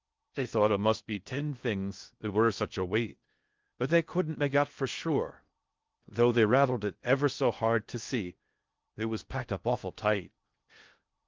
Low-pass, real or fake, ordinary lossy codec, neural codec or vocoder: 7.2 kHz; fake; Opus, 24 kbps; codec, 16 kHz in and 24 kHz out, 0.6 kbps, FocalCodec, streaming, 2048 codes